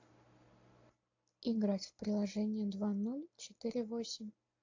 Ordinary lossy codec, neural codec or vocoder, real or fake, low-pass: AAC, 48 kbps; none; real; 7.2 kHz